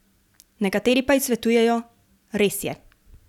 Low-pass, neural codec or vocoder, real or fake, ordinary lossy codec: 19.8 kHz; none; real; none